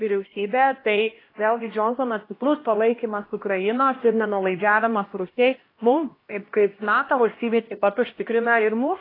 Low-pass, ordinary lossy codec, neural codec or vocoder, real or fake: 5.4 kHz; AAC, 24 kbps; codec, 16 kHz, 1 kbps, X-Codec, HuBERT features, trained on LibriSpeech; fake